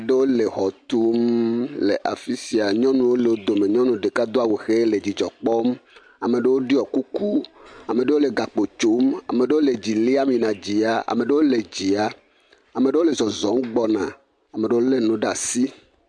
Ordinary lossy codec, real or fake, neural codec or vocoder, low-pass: MP3, 64 kbps; real; none; 9.9 kHz